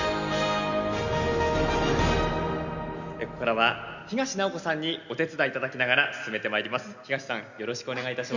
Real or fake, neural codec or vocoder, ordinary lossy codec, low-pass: real; none; none; 7.2 kHz